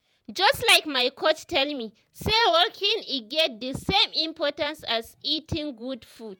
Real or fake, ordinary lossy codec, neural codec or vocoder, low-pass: fake; none; vocoder, 48 kHz, 128 mel bands, Vocos; none